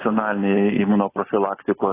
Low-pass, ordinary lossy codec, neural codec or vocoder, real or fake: 3.6 kHz; AAC, 16 kbps; none; real